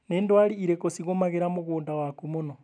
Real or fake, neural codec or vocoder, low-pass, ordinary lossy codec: real; none; 9.9 kHz; none